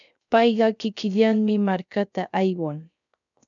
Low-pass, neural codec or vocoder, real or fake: 7.2 kHz; codec, 16 kHz, 0.3 kbps, FocalCodec; fake